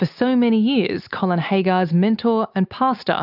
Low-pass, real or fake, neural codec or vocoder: 5.4 kHz; real; none